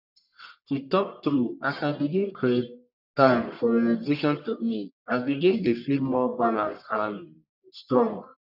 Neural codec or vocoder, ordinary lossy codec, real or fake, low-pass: codec, 44.1 kHz, 1.7 kbps, Pupu-Codec; none; fake; 5.4 kHz